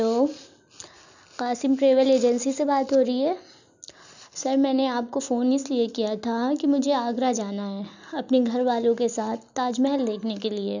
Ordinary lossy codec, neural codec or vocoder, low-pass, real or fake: none; none; 7.2 kHz; real